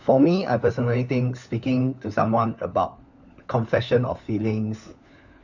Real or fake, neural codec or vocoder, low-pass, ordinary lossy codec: fake; codec, 16 kHz, 4 kbps, FunCodec, trained on LibriTTS, 50 frames a second; 7.2 kHz; none